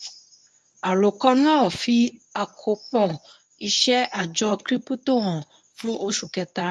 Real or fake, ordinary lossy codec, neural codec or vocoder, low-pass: fake; none; codec, 24 kHz, 0.9 kbps, WavTokenizer, medium speech release version 1; none